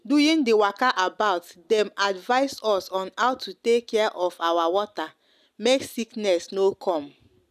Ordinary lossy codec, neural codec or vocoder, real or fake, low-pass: none; none; real; 14.4 kHz